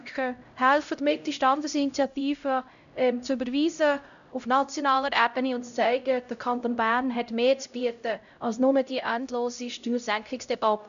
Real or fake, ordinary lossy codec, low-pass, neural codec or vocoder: fake; none; 7.2 kHz; codec, 16 kHz, 0.5 kbps, X-Codec, HuBERT features, trained on LibriSpeech